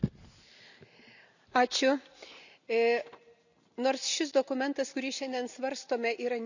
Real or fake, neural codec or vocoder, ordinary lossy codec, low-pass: real; none; none; 7.2 kHz